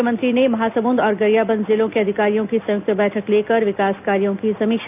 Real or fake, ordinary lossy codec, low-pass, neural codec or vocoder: real; none; 3.6 kHz; none